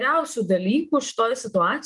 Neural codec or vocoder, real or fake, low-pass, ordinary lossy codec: none; real; 10.8 kHz; Opus, 24 kbps